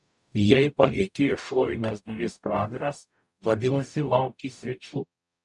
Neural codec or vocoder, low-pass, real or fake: codec, 44.1 kHz, 0.9 kbps, DAC; 10.8 kHz; fake